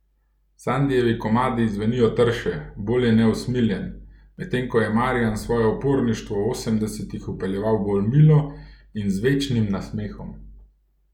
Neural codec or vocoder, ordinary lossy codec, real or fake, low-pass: none; none; real; 19.8 kHz